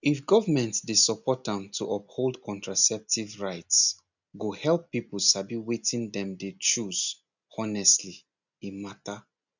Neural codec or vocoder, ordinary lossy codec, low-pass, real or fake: none; none; 7.2 kHz; real